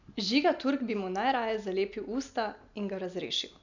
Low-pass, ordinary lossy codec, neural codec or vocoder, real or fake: 7.2 kHz; none; none; real